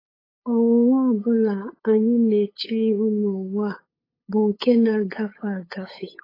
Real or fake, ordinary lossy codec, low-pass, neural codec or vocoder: fake; AAC, 24 kbps; 5.4 kHz; codec, 16 kHz, 8 kbps, FunCodec, trained on LibriTTS, 25 frames a second